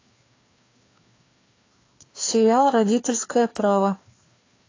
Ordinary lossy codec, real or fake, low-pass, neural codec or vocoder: AAC, 32 kbps; fake; 7.2 kHz; codec, 16 kHz, 2 kbps, FreqCodec, larger model